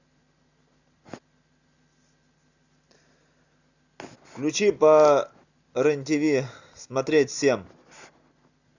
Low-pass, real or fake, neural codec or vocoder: 7.2 kHz; real; none